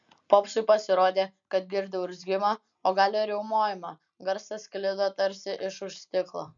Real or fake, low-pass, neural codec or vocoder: real; 7.2 kHz; none